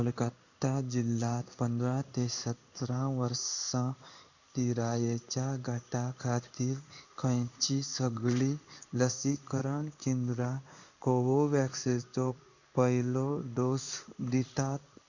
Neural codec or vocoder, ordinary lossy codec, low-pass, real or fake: codec, 16 kHz in and 24 kHz out, 1 kbps, XY-Tokenizer; none; 7.2 kHz; fake